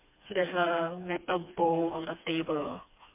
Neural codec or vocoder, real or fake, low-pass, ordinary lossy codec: codec, 16 kHz, 2 kbps, FreqCodec, smaller model; fake; 3.6 kHz; MP3, 32 kbps